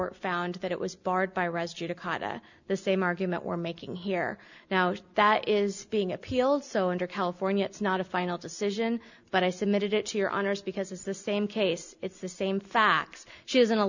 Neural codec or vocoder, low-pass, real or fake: none; 7.2 kHz; real